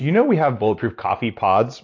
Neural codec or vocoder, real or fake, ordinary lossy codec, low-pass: none; real; AAC, 48 kbps; 7.2 kHz